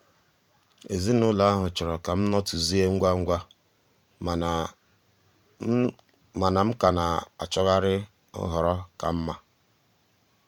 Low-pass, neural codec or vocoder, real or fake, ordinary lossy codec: 19.8 kHz; vocoder, 44.1 kHz, 128 mel bands every 512 samples, BigVGAN v2; fake; none